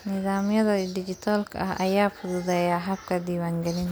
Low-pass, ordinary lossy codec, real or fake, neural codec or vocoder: none; none; real; none